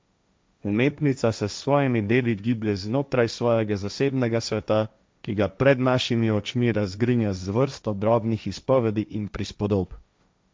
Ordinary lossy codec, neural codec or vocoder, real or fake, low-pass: none; codec, 16 kHz, 1.1 kbps, Voila-Tokenizer; fake; none